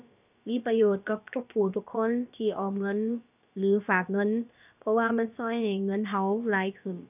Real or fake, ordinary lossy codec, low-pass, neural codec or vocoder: fake; none; 3.6 kHz; codec, 16 kHz, about 1 kbps, DyCAST, with the encoder's durations